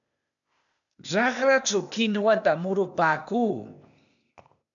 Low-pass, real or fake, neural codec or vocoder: 7.2 kHz; fake; codec, 16 kHz, 0.8 kbps, ZipCodec